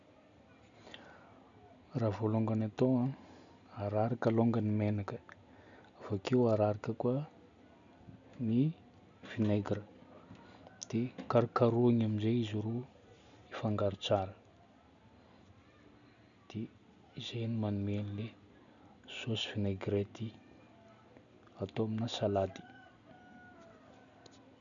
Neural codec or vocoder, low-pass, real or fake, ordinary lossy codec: none; 7.2 kHz; real; none